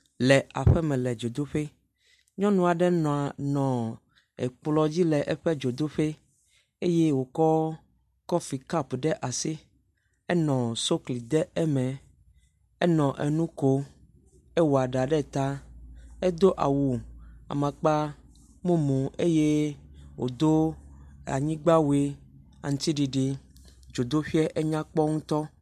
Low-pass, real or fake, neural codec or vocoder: 14.4 kHz; real; none